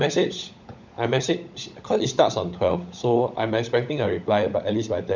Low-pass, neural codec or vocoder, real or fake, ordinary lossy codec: 7.2 kHz; codec, 16 kHz, 16 kbps, FunCodec, trained on LibriTTS, 50 frames a second; fake; none